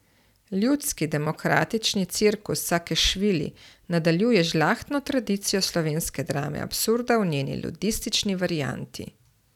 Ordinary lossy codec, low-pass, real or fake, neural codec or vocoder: none; 19.8 kHz; real; none